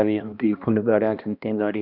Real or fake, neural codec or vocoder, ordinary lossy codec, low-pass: fake; codec, 16 kHz, 1 kbps, X-Codec, HuBERT features, trained on balanced general audio; none; 5.4 kHz